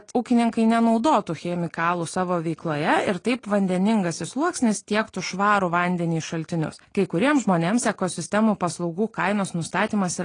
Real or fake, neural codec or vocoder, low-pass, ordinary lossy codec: real; none; 9.9 kHz; AAC, 32 kbps